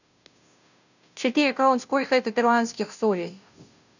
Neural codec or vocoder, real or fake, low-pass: codec, 16 kHz, 0.5 kbps, FunCodec, trained on Chinese and English, 25 frames a second; fake; 7.2 kHz